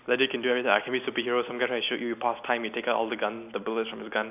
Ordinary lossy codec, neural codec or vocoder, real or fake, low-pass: none; none; real; 3.6 kHz